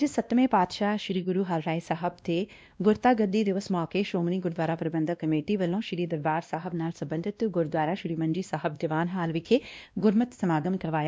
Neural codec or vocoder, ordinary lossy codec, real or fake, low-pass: codec, 16 kHz, 1 kbps, X-Codec, WavLM features, trained on Multilingual LibriSpeech; none; fake; none